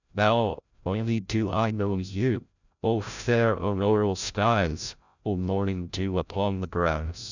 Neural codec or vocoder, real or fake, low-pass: codec, 16 kHz, 0.5 kbps, FreqCodec, larger model; fake; 7.2 kHz